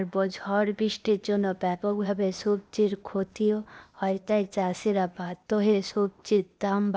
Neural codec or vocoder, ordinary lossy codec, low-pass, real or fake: codec, 16 kHz, 0.8 kbps, ZipCodec; none; none; fake